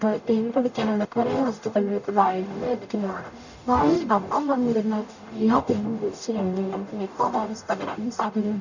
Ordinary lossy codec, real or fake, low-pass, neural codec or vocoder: none; fake; 7.2 kHz; codec, 44.1 kHz, 0.9 kbps, DAC